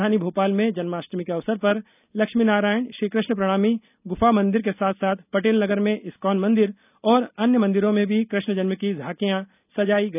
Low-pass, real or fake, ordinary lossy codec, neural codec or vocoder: 3.6 kHz; real; none; none